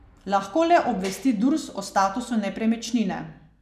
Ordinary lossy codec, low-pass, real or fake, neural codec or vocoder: none; 14.4 kHz; real; none